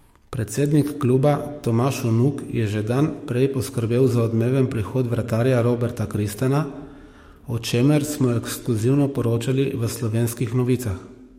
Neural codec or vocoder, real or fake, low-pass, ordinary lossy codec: codec, 44.1 kHz, 7.8 kbps, DAC; fake; 19.8 kHz; MP3, 64 kbps